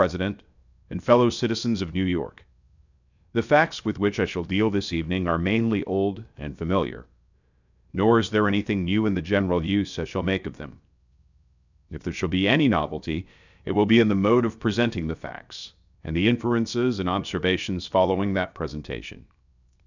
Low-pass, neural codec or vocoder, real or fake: 7.2 kHz; codec, 16 kHz, 0.7 kbps, FocalCodec; fake